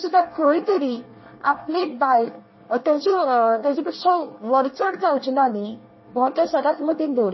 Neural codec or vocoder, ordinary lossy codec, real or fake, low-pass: codec, 24 kHz, 1 kbps, SNAC; MP3, 24 kbps; fake; 7.2 kHz